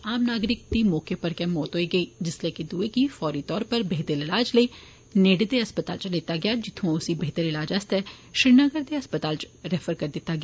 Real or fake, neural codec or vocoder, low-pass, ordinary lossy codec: real; none; none; none